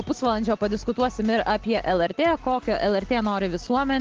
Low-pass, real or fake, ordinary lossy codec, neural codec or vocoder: 7.2 kHz; real; Opus, 16 kbps; none